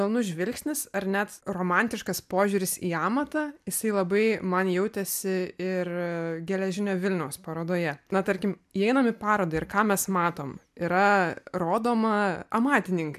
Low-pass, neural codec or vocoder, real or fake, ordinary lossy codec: 14.4 kHz; none; real; MP3, 96 kbps